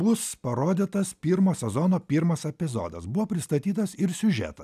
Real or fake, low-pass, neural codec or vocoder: real; 14.4 kHz; none